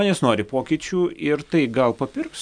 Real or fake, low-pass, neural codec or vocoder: real; 9.9 kHz; none